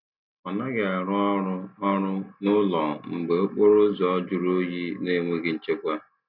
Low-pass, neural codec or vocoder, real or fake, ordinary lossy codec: 5.4 kHz; none; real; none